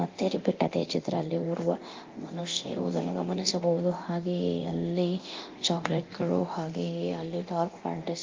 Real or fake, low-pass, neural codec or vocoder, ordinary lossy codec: fake; 7.2 kHz; codec, 24 kHz, 0.9 kbps, DualCodec; Opus, 24 kbps